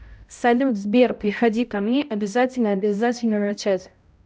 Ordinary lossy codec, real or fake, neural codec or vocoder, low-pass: none; fake; codec, 16 kHz, 0.5 kbps, X-Codec, HuBERT features, trained on balanced general audio; none